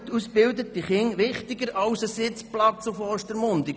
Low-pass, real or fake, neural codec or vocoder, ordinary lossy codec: none; real; none; none